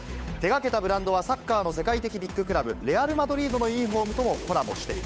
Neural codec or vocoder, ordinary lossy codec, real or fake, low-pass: codec, 16 kHz, 8 kbps, FunCodec, trained on Chinese and English, 25 frames a second; none; fake; none